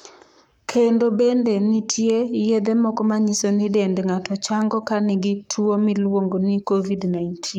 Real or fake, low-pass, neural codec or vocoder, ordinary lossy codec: fake; 19.8 kHz; codec, 44.1 kHz, 7.8 kbps, Pupu-Codec; none